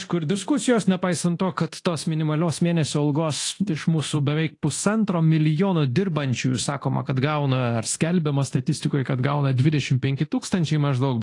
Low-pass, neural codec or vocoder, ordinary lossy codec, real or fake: 10.8 kHz; codec, 24 kHz, 0.9 kbps, DualCodec; AAC, 48 kbps; fake